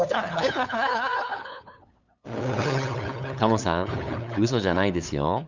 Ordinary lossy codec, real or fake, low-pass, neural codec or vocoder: Opus, 64 kbps; fake; 7.2 kHz; codec, 16 kHz, 8 kbps, FunCodec, trained on LibriTTS, 25 frames a second